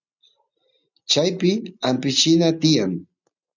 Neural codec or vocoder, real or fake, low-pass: none; real; 7.2 kHz